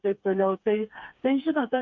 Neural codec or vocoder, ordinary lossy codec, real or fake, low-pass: codec, 16 kHz, 4 kbps, FreqCodec, smaller model; Opus, 64 kbps; fake; 7.2 kHz